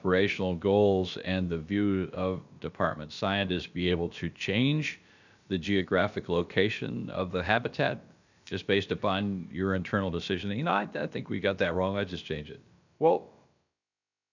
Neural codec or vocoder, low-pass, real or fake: codec, 16 kHz, about 1 kbps, DyCAST, with the encoder's durations; 7.2 kHz; fake